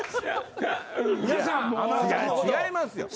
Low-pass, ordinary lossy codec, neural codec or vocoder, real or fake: none; none; none; real